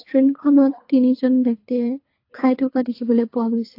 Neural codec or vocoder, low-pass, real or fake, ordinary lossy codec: codec, 24 kHz, 3 kbps, HILCodec; 5.4 kHz; fake; AAC, 32 kbps